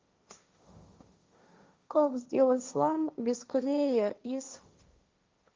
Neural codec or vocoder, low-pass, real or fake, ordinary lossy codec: codec, 16 kHz, 1.1 kbps, Voila-Tokenizer; 7.2 kHz; fake; Opus, 32 kbps